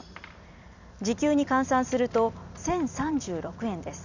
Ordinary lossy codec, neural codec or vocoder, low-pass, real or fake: none; vocoder, 44.1 kHz, 128 mel bands every 256 samples, BigVGAN v2; 7.2 kHz; fake